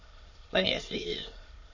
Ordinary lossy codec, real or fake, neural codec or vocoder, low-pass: MP3, 32 kbps; fake; autoencoder, 22.05 kHz, a latent of 192 numbers a frame, VITS, trained on many speakers; 7.2 kHz